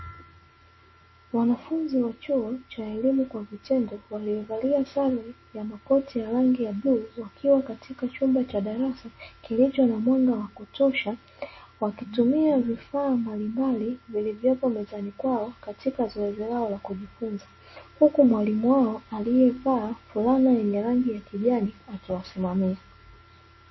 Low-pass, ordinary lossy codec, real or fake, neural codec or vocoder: 7.2 kHz; MP3, 24 kbps; real; none